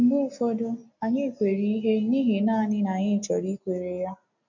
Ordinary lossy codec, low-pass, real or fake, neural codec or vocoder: none; 7.2 kHz; real; none